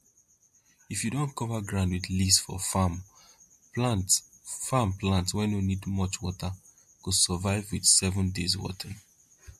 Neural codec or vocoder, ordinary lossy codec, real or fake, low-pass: none; MP3, 64 kbps; real; 14.4 kHz